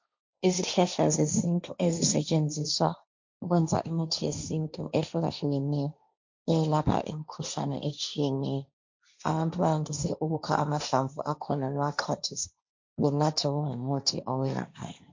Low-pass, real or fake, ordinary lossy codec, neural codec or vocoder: 7.2 kHz; fake; MP3, 64 kbps; codec, 16 kHz, 1.1 kbps, Voila-Tokenizer